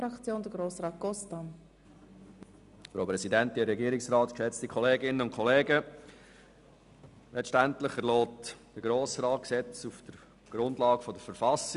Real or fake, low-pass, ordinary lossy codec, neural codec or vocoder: real; 10.8 kHz; none; none